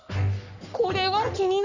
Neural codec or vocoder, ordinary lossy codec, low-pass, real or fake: codec, 44.1 kHz, 3.4 kbps, Pupu-Codec; none; 7.2 kHz; fake